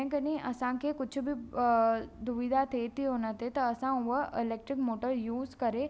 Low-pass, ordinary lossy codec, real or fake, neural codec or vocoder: none; none; real; none